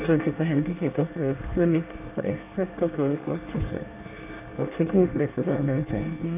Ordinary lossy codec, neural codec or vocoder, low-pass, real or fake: none; codec, 24 kHz, 1 kbps, SNAC; 3.6 kHz; fake